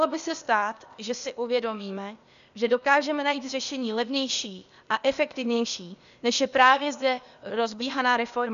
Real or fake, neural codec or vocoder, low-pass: fake; codec, 16 kHz, 0.8 kbps, ZipCodec; 7.2 kHz